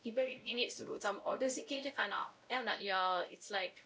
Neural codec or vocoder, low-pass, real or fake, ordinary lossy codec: codec, 16 kHz, 0.5 kbps, X-Codec, WavLM features, trained on Multilingual LibriSpeech; none; fake; none